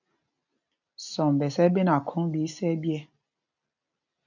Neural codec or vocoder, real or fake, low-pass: none; real; 7.2 kHz